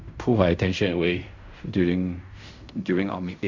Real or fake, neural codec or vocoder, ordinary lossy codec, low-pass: fake; codec, 16 kHz in and 24 kHz out, 0.4 kbps, LongCat-Audio-Codec, fine tuned four codebook decoder; Opus, 64 kbps; 7.2 kHz